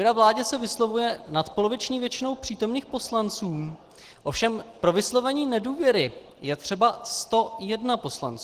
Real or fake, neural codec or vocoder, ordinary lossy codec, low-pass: real; none; Opus, 16 kbps; 14.4 kHz